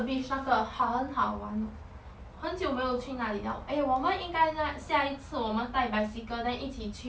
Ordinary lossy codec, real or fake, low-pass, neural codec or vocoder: none; real; none; none